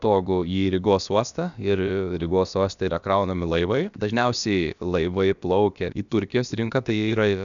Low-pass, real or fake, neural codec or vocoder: 7.2 kHz; fake; codec, 16 kHz, about 1 kbps, DyCAST, with the encoder's durations